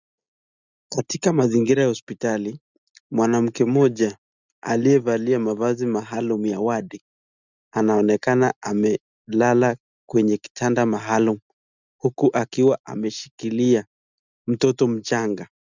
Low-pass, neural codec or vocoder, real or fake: 7.2 kHz; none; real